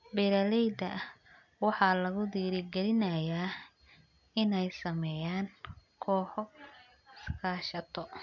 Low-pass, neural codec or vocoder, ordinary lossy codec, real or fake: 7.2 kHz; none; none; real